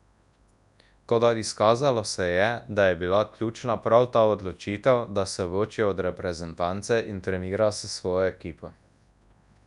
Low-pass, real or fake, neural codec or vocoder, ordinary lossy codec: 10.8 kHz; fake; codec, 24 kHz, 0.9 kbps, WavTokenizer, large speech release; none